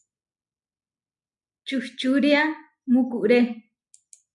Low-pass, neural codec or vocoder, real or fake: 10.8 kHz; none; real